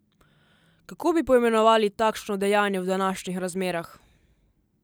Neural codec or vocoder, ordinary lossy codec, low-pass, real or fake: none; none; none; real